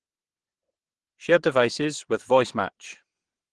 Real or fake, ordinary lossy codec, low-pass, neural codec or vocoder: fake; Opus, 16 kbps; 9.9 kHz; vocoder, 22.05 kHz, 80 mel bands, WaveNeXt